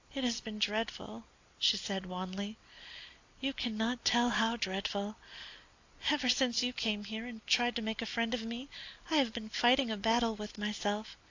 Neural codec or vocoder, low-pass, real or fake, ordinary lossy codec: none; 7.2 kHz; real; AAC, 48 kbps